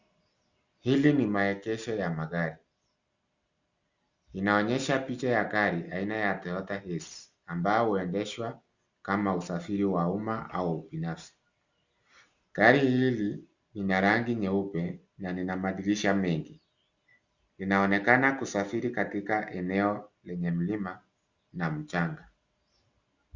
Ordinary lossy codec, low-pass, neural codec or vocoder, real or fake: Opus, 64 kbps; 7.2 kHz; none; real